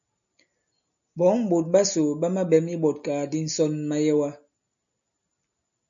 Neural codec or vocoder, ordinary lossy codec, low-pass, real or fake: none; MP3, 96 kbps; 7.2 kHz; real